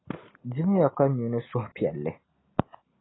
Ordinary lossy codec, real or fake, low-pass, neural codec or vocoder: AAC, 16 kbps; real; 7.2 kHz; none